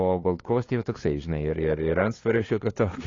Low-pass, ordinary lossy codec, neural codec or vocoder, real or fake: 7.2 kHz; AAC, 32 kbps; codec, 16 kHz, 2 kbps, FunCodec, trained on LibriTTS, 25 frames a second; fake